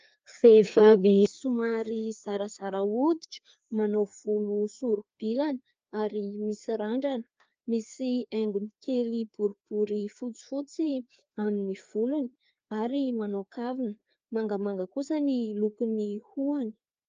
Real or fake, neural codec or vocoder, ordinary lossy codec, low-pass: fake; codec, 16 kHz, 2 kbps, FreqCodec, larger model; Opus, 24 kbps; 7.2 kHz